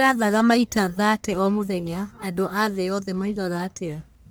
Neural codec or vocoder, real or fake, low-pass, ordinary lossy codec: codec, 44.1 kHz, 1.7 kbps, Pupu-Codec; fake; none; none